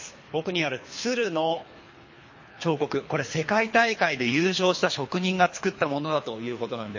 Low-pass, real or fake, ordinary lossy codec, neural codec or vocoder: 7.2 kHz; fake; MP3, 32 kbps; codec, 24 kHz, 3 kbps, HILCodec